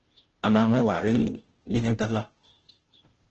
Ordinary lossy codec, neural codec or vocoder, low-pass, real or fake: Opus, 16 kbps; codec, 16 kHz, 0.5 kbps, FunCodec, trained on Chinese and English, 25 frames a second; 7.2 kHz; fake